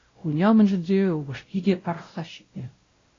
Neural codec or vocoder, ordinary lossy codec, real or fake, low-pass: codec, 16 kHz, 0.5 kbps, X-Codec, WavLM features, trained on Multilingual LibriSpeech; AAC, 32 kbps; fake; 7.2 kHz